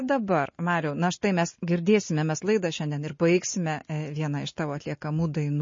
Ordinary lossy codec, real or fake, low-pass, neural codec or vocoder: MP3, 32 kbps; real; 7.2 kHz; none